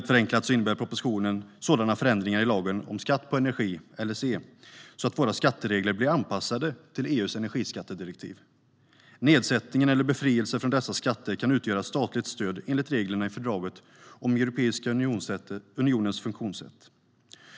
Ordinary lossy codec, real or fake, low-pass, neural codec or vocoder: none; real; none; none